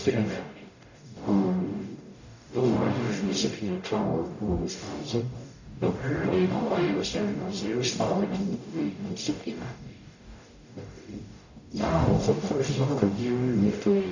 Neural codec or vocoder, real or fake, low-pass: codec, 44.1 kHz, 0.9 kbps, DAC; fake; 7.2 kHz